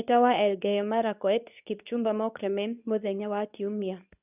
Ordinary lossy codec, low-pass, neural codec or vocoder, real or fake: none; 3.6 kHz; codec, 24 kHz, 0.9 kbps, WavTokenizer, medium speech release version 2; fake